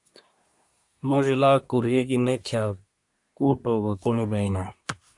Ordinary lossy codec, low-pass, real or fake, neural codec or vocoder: AAC, 64 kbps; 10.8 kHz; fake; codec, 24 kHz, 1 kbps, SNAC